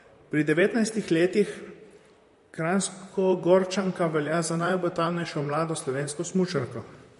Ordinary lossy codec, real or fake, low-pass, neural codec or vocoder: MP3, 48 kbps; fake; 14.4 kHz; vocoder, 44.1 kHz, 128 mel bands, Pupu-Vocoder